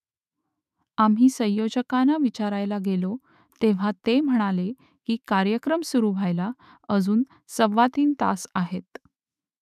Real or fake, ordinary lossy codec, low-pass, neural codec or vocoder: fake; none; 14.4 kHz; autoencoder, 48 kHz, 128 numbers a frame, DAC-VAE, trained on Japanese speech